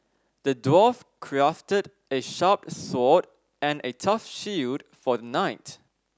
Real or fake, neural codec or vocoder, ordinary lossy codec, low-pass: real; none; none; none